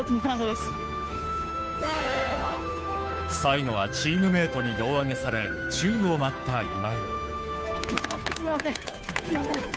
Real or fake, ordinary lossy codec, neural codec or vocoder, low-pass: fake; none; codec, 16 kHz, 2 kbps, FunCodec, trained on Chinese and English, 25 frames a second; none